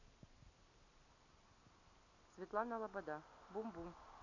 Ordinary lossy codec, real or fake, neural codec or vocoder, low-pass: none; real; none; 7.2 kHz